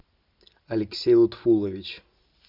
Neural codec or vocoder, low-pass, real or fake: none; 5.4 kHz; real